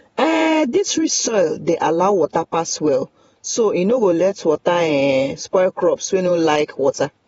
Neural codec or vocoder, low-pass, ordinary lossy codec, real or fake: vocoder, 48 kHz, 128 mel bands, Vocos; 19.8 kHz; AAC, 24 kbps; fake